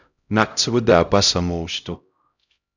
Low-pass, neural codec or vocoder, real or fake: 7.2 kHz; codec, 16 kHz, 0.5 kbps, X-Codec, HuBERT features, trained on LibriSpeech; fake